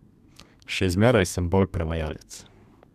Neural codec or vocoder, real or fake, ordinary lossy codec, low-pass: codec, 32 kHz, 1.9 kbps, SNAC; fake; none; 14.4 kHz